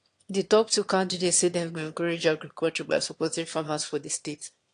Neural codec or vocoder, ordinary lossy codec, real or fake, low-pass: autoencoder, 22.05 kHz, a latent of 192 numbers a frame, VITS, trained on one speaker; AAC, 48 kbps; fake; 9.9 kHz